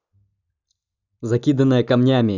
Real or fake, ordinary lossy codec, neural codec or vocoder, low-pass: real; none; none; 7.2 kHz